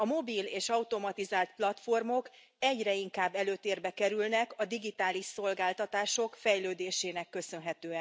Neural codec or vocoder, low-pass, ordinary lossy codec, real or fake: none; none; none; real